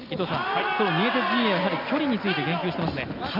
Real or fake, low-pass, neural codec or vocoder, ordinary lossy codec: real; 5.4 kHz; none; none